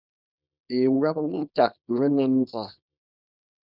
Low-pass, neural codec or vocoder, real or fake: 5.4 kHz; codec, 24 kHz, 0.9 kbps, WavTokenizer, small release; fake